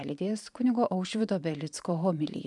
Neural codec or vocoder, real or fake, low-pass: vocoder, 44.1 kHz, 128 mel bands every 512 samples, BigVGAN v2; fake; 10.8 kHz